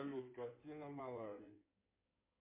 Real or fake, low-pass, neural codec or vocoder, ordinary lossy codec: fake; 3.6 kHz; codec, 16 kHz in and 24 kHz out, 2.2 kbps, FireRedTTS-2 codec; MP3, 24 kbps